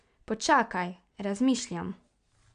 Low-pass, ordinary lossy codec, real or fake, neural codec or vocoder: 9.9 kHz; none; real; none